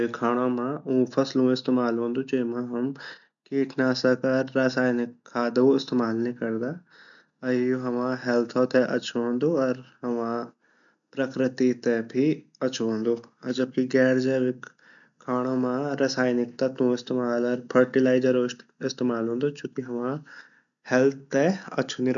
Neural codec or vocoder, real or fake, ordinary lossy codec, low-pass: none; real; none; 7.2 kHz